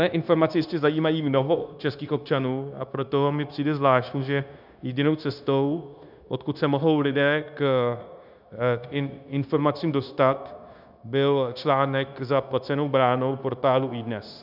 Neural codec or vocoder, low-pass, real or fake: codec, 16 kHz, 0.9 kbps, LongCat-Audio-Codec; 5.4 kHz; fake